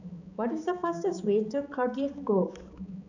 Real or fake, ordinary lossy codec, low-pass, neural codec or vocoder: fake; none; 7.2 kHz; codec, 16 kHz, 4 kbps, X-Codec, HuBERT features, trained on balanced general audio